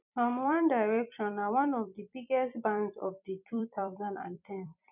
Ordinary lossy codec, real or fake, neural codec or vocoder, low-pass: none; fake; vocoder, 44.1 kHz, 128 mel bands every 256 samples, BigVGAN v2; 3.6 kHz